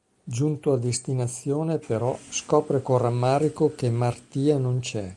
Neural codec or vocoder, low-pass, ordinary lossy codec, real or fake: none; 10.8 kHz; Opus, 32 kbps; real